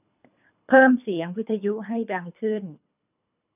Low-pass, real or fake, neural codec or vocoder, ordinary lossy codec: 3.6 kHz; fake; codec, 24 kHz, 3 kbps, HILCodec; none